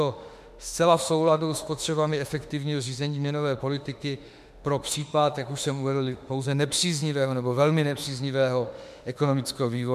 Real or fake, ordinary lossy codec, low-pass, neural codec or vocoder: fake; MP3, 96 kbps; 14.4 kHz; autoencoder, 48 kHz, 32 numbers a frame, DAC-VAE, trained on Japanese speech